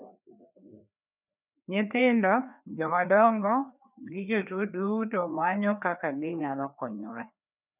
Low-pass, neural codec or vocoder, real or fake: 3.6 kHz; codec, 16 kHz, 2 kbps, FreqCodec, larger model; fake